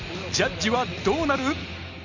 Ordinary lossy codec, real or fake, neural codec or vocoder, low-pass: Opus, 64 kbps; real; none; 7.2 kHz